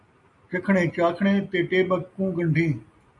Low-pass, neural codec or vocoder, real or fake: 10.8 kHz; none; real